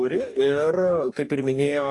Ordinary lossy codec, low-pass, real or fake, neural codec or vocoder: AAC, 48 kbps; 10.8 kHz; fake; codec, 44.1 kHz, 2.6 kbps, DAC